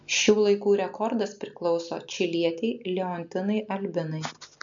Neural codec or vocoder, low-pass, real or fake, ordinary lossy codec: none; 7.2 kHz; real; MP3, 64 kbps